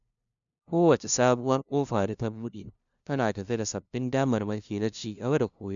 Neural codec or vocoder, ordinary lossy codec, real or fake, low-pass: codec, 16 kHz, 0.5 kbps, FunCodec, trained on LibriTTS, 25 frames a second; none; fake; 7.2 kHz